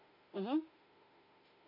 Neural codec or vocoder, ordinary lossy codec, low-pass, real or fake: autoencoder, 48 kHz, 32 numbers a frame, DAC-VAE, trained on Japanese speech; MP3, 32 kbps; 5.4 kHz; fake